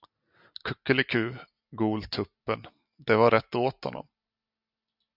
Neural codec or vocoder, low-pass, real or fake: none; 5.4 kHz; real